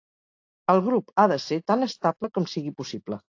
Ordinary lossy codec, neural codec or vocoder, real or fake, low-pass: AAC, 48 kbps; none; real; 7.2 kHz